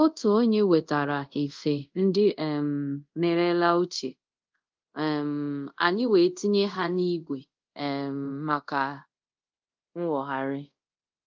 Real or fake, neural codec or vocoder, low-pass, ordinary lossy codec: fake; codec, 24 kHz, 0.5 kbps, DualCodec; 7.2 kHz; Opus, 32 kbps